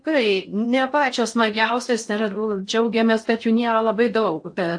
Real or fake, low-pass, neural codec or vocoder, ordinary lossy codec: fake; 9.9 kHz; codec, 16 kHz in and 24 kHz out, 0.6 kbps, FocalCodec, streaming, 4096 codes; AAC, 64 kbps